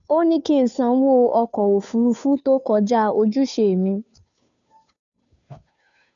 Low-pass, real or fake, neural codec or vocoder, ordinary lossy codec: 7.2 kHz; fake; codec, 16 kHz, 2 kbps, FunCodec, trained on Chinese and English, 25 frames a second; none